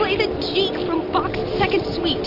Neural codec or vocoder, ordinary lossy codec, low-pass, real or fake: none; Opus, 64 kbps; 5.4 kHz; real